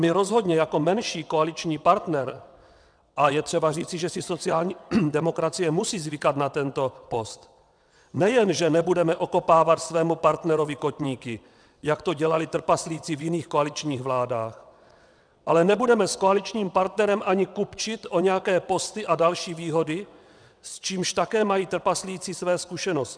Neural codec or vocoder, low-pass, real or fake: vocoder, 22.05 kHz, 80 mel bands, WaveNeXt; 9.9 kHz; fake